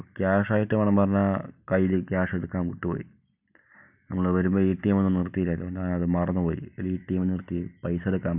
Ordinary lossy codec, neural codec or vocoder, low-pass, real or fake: none; none; 3.6 kHz; real